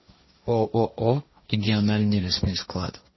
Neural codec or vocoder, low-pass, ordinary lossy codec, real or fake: codec, 16 kHz, 1.1 kbps, Voila-Tokenizer; 7.2 kHz; MP3, 24 kbps; fake